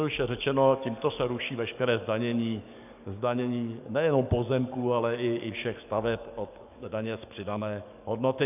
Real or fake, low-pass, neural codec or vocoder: fake; 3.6 kHz; codec, 44.1 kHz, 7.8 kbps, DAC